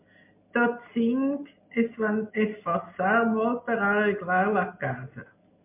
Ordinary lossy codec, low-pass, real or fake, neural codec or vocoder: MP3, 32 kbps; 3.6 kHz; real; none